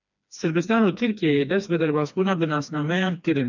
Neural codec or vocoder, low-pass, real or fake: codec, 16 kHz, 2 kbps, FreqCodec, smaller model; 7.2 kHz; fake